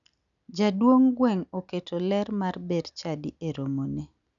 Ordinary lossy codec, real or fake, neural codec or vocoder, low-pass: none; real; none; 7.2 kHz